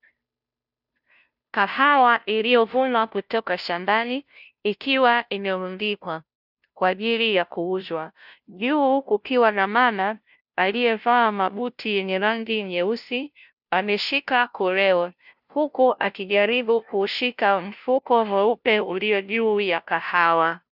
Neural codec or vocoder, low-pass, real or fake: codec, 16 kHz, 0.5 kbps, FunCodec, trained on Chinese and English, 25 frames a second; 5.4 kHz; fake